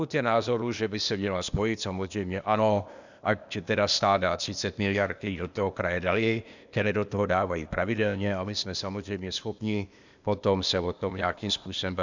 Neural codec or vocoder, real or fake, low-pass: codec, 16 kHz, 0.8 kbps, ZipCodec; fake; 7.2 kHz